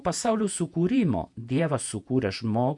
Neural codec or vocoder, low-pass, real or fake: vocoder, 48 kHz, 128 mel bands, Vocos; 10.8 kHz; fake